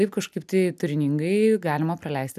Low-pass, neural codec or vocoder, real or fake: 14.4 kHz; none; real